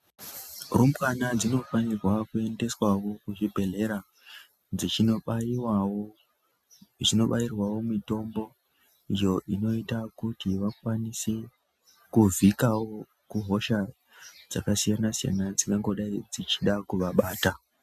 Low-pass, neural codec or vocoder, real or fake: 14.4 kHz; none; real